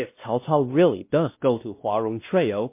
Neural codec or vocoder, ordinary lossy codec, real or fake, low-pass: codec, 16 kHz, 1 kbps, X-Codec, WavLM features, trained on Multilingual LibriSpeech; MP3, 24 kbps; fake; 3.6 kHz